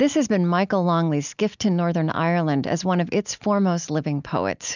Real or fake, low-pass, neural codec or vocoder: real; 7.2 kHz; none